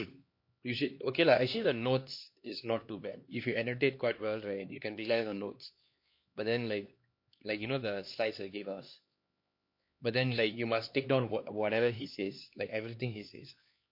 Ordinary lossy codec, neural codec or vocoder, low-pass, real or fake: MP3, 32 kbps; codec, 16 kHz, 2 kbps, X-Codec, HuBERT features, trained on LibriSpeech; 5.4 kHz; fake